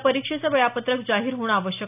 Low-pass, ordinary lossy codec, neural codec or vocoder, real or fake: 3.6 kHz; none; none; real